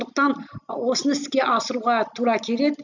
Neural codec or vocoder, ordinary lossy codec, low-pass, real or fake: none; none; 7.2 kHz; real